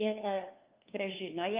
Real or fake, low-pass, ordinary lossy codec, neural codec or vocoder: fake; 3.6 kHz; Opus, 24 kbps; codec, 16 kHz, 2 kbps, FunCodec, trained on LibriTTS, 25 frames a second